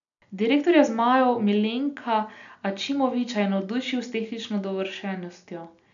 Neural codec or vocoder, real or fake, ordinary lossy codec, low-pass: none; real; none; 7.2 kHz